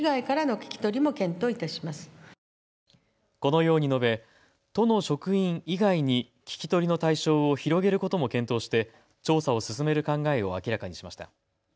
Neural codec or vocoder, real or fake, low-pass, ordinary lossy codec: none; real; none; none